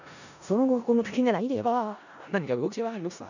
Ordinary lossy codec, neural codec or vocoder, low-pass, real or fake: none; codec, 16 kHz in and 24 kHz out, 0.4 kbps, LongCat-Audio-Codec, four codebook decoder; 7.2 kHz; fake